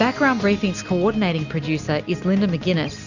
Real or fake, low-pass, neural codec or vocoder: real; 7.2 kHz; none